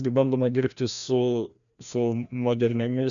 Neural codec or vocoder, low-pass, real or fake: codec, 16 kHz, 1 kbps, FreqCodec, larger model; 7.2 kHz; fake